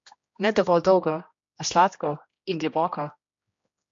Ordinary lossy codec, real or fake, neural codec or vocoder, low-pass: MP3, 48 kbps; fake; codec, 16 kHz, 1 kbps, X-Codec, HuBERT features, trained on general audio; 7.2 kHz